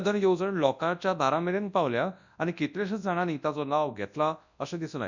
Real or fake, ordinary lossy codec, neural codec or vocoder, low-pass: fake; none; codec, 24 kHz, 0.9 kbps, WavTokenizer, large speech release; 7.2 kHz